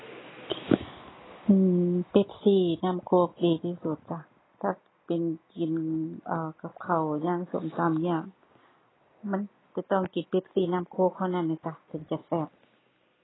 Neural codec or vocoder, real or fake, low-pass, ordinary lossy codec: none; real; 7.2 kHz; AAC, 16 kbps